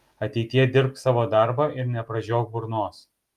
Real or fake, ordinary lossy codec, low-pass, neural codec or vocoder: real; Opus, 32 kbps; 14.4 kHz; none